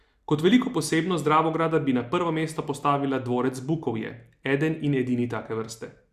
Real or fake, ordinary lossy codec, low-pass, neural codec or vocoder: real; Opus, 64 kbps; 14.4 kHz; none